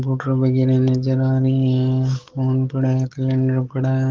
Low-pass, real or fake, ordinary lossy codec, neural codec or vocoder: 7.2 kHz; fake; Opus, 16 kbps; codec, 44.1 kHz, 7.8 kbps, DAC